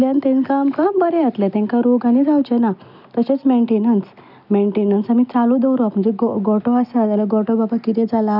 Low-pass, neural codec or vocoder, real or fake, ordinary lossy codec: 5.4 kHz; none; real; none